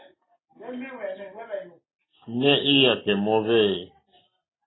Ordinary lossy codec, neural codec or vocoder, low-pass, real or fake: AAC, 16 kbps; none; 7.2 kHz; real